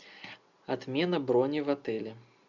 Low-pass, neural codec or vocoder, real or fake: 7.2 kHz; none; real